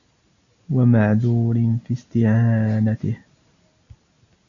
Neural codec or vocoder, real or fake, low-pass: none; real; 7.2 kHz